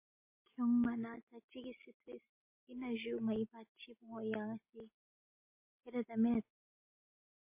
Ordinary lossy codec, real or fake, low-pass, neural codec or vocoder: MP3, 24 kbps; real; 3.6 kHz; none